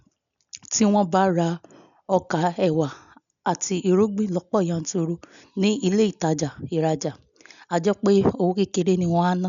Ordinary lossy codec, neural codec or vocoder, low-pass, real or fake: none; none; 7.2 kHz; real